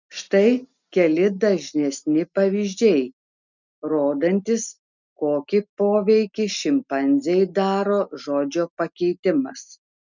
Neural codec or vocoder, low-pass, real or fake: none; 7.2 kHz; real